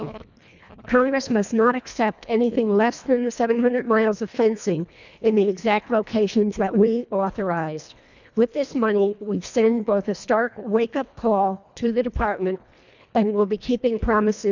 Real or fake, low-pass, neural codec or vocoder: fake; 7.2 kHz; codec, 24 kHz, 1.5 kbps, HILCodec